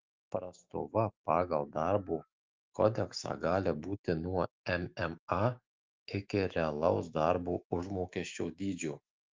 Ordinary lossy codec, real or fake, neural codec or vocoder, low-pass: Opus, 24 kbps; fake; autoencoder, 48 kHz, 128 numbers a frame, DAC-VAE, trained on Japanese speech; 7.2 kHz